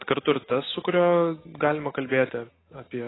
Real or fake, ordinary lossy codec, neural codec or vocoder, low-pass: real; AAC, 16 kbps; none; 7.2 kHz